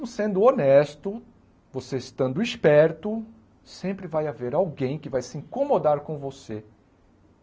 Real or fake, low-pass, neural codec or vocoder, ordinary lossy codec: real; none; none; none